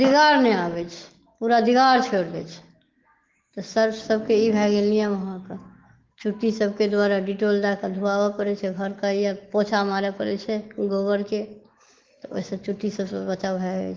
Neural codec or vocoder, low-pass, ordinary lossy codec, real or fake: autoencoder, 48 kHz, 128 numbers a frame, DAC-VAE, trained on Japanese speech; 7.2 kHz; Opus, 16 kbps; fake